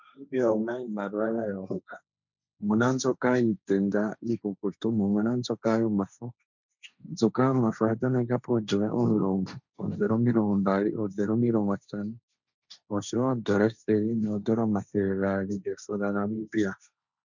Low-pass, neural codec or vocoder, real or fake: 7.2 kHz; codec, 16 kHz, 1.1 kbps, Voila-Tokenizer; fake